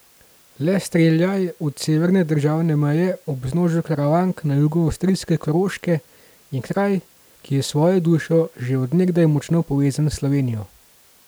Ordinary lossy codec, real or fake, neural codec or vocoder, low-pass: none; real; none; none